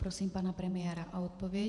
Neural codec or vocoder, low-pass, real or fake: vocoder, 48 kHz, 128 mel bands, Vocos; 10.8 kHz; fake